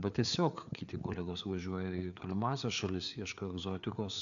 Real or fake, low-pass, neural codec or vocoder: fake; 7.2 kHz; codec, 16 kHz, 4 kbps, FreqCodec, larger model